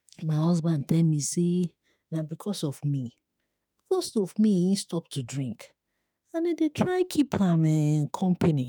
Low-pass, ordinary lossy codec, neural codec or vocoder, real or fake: none; none; autoencoder, 48 kHz, 32 numbers a frame, DAC-VAE, trained on Japanese speech; fake